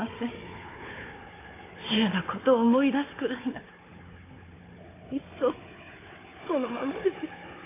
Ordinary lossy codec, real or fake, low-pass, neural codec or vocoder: AAC, 16 kbps; fake; 3.6 kHz; codec, 16 kHz, 4 kbps, FunCodec, trained on Chinese and English, 50 frames a second